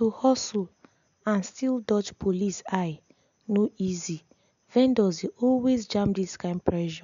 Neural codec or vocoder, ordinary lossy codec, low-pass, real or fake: none; none; 7.2 kHz; real